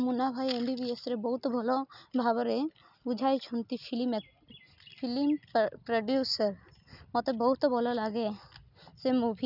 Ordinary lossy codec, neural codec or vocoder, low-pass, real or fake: none; none; 5.4 kHz; real